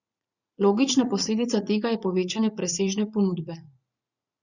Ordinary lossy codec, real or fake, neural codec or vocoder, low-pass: Opus, 64 kbps; fake; vocoder, 44.1 kHz, 80 mel bands, Vocos; 7.2 kHz